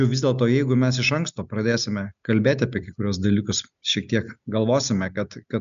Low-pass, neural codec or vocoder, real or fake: 7.2 kHz; none; real